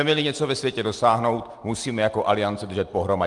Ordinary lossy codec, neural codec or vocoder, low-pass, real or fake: Opus, 32 kbps; none; 10.8 kHz; real